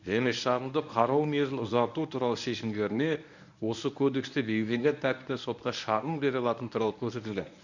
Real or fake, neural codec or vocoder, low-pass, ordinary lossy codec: fake; codec, 24 kHz, 0.9 kbps, WavTokenizer, medium speech release version 1; 7.2 kHz; none